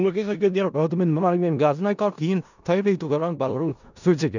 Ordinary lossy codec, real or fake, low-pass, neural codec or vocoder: none; fake; 7.2 kHz; codec, 16 kHz in and 24 kHz out, 0.4 kbps, LongCat-Audio-Codec, four codebook decoder